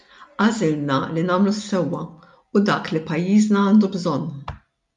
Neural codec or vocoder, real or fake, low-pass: none; real; 10.8 kHz